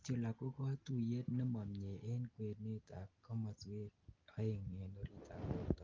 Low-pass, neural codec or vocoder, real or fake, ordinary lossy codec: 7.2 kHz; none; real; Opus, 24 kbps